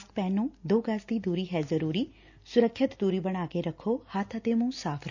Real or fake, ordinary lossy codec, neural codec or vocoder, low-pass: real; none; none; 7.2 kHz